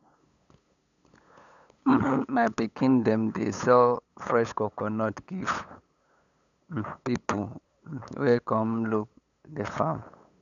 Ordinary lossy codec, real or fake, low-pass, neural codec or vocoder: none; fake; 7.2 kHz; codec, 16 kHz, 8 kbps, FunCodec, trained on LibriTTS, 25 frames a second